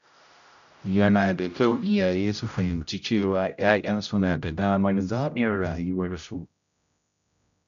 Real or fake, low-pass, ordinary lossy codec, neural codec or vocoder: fake; 7.2 kHz; none; codec, 16 kHz, 0.5 kbps, X-Codec, HuBERT features, trained on general audio